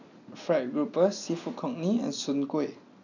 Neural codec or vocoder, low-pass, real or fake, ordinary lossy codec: none; 7.2 kHz; real; none